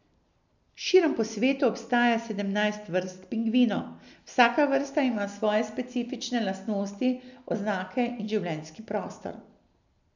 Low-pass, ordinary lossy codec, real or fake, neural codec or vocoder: 7.2 kHz; none; real; none